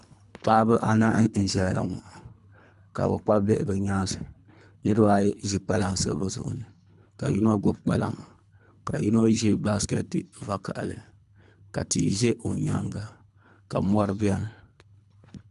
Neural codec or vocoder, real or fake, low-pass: codec, 24 kHz, 3 kbps, HILCodec; fake; 10.8 kHz